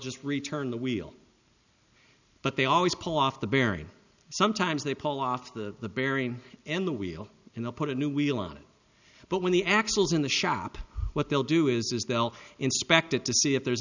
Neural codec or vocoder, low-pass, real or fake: none; 7.2 kHz; real